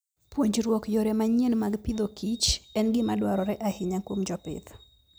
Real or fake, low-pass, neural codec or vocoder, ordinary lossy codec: real; none; none; none